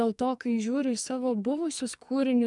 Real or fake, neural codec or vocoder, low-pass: fake; codec, 44.1 kHz, 2.6 kbps, SNAC; 10.8 kHz